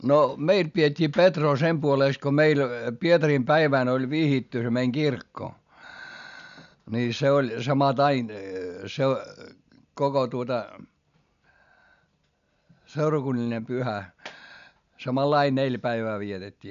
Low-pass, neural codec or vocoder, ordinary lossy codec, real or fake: 7.2 kHz; none; none; real